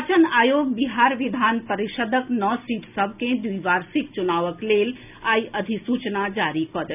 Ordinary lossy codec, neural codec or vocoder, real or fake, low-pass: none; none; real; 3.6 kHz